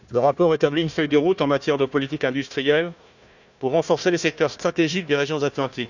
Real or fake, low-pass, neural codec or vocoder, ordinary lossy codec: fake; 7.2 kHz; codec, 16 kHz, 1 kbps, FunCodec, trained on Chinese and English, 50 frames a second; none